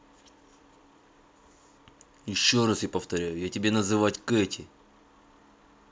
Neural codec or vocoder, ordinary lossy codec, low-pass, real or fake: none; none; none; real